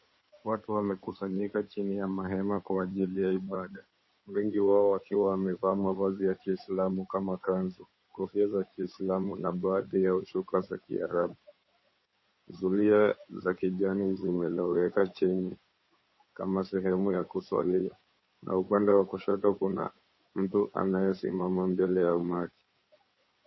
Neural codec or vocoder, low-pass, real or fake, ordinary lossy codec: codec, 16 kHz in and 24 kHz out, 2.2 kbps, FireRedTTS-2 codec; 7.2 kHz; fake; MP3, 24 kbps